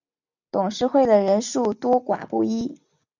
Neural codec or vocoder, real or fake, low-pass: none; real; 7.2 kHz